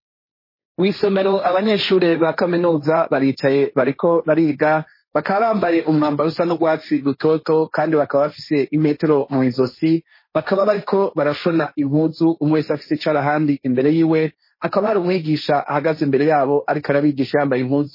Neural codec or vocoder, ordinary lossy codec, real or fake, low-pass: codec, 16 kHz, 1.1 kbps, Voila-Tokenizer; MP3, 24 kbps; fake; 5.4 kHz